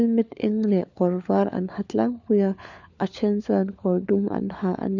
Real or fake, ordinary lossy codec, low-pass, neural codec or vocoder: fake; none; 7.2 kHz; codec, 16 kHz, 4 kbps, FunCodec, trained on LibriTTS, 50 frames a second